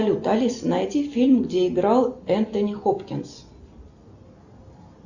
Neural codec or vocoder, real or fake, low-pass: none; real; 7.2 kHz